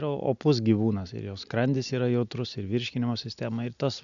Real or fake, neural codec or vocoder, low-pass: real; none; 7.2 kHz